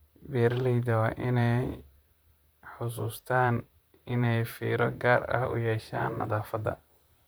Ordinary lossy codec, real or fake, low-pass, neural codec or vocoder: none; fake; none; vocoder, 44.1 kHz, 128 mel bands, Pupu-Vocoder